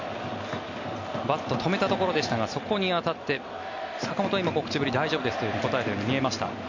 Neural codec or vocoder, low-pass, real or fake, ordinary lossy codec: none; 7.2 kHz; real; MP3, 48 kbps